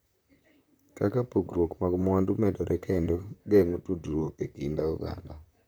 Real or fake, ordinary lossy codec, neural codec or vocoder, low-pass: fake; none; vocoder, 44.1 kHz, 128 mel bands, Pupu-Vocoder; none